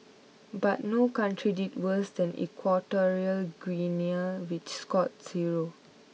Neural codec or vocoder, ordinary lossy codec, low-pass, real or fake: none; none; none; real